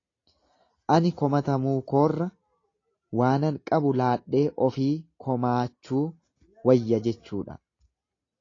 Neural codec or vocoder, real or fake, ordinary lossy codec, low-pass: none; real; AAC, 32 kbps; 7.2 kHz